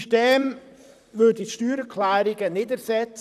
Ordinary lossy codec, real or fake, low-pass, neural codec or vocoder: none; fake; 14.4 kHz; vocoder, 44.1 kHz, 128 mel bands, Pupu-Vocoder